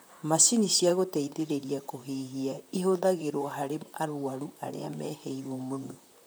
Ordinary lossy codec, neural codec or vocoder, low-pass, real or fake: none; vocoder, 44.1 kHz, 128 mel bands, Pupu-Vocoder; none; fake